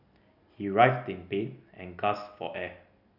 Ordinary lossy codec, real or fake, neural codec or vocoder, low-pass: none; real; none; 5.4 kHz